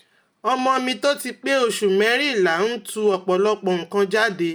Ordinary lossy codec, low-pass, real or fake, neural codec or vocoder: none; none; real; none